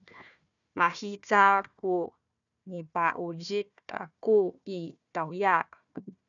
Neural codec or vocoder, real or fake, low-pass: codec, 16 kHz, 1 kbps, FunCodec, trained on Chinese and English, 50 frames a second; fake; 7.2 kHz